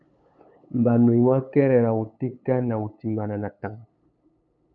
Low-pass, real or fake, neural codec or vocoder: 7.2 kHz; fake; codec, 16 kHz, 8 kbps, FunCodec, trained on LibriTTS, 25 frames a second